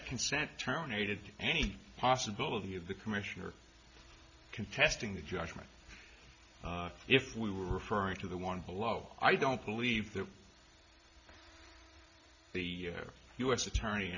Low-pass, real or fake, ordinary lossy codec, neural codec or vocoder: 7.2 kHz; real; Opus, 64 kbps; none